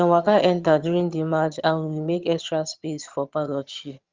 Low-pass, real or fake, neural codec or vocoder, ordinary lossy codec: 7.2 kHz; fake; vocoder, 22.05 kHz, 80 mel bands, HiFi-GAN; Opus, 32 kbps